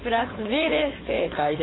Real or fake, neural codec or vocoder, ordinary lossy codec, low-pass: fake; codec, 16 kHz, 4.8 kbps, FACodec; AAC, 16 kbps; 7.2 kHz